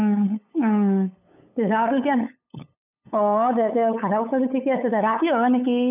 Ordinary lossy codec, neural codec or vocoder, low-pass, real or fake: none; codec, 16 kHz, 8 kbps, FunCodec, trained on LibriTTS, 25 frames a second; 3.6 kHz; fake